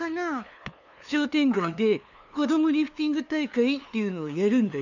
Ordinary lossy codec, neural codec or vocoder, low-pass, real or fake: none; codec, 16 kHz, 2 kbps, FunCodec, trained on LibriTTS, 25 frames a second; 7.2 kHz; fake